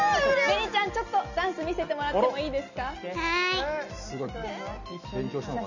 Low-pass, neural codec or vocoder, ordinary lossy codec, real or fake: 7.2 kHz; none; none; real